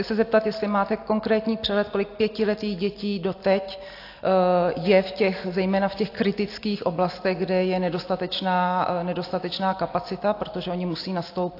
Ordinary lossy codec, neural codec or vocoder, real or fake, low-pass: AAC, 32 kbps; none; real; 5.4 kHz